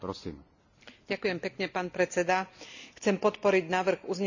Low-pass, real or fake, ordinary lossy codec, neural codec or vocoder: 7.2 kHz; real; none; none